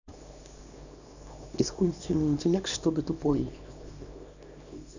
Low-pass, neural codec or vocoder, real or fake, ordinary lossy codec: 7.2 kHz; codec, 24 kHz, 0.9 kbps, WavTokenizer, small release; fake; none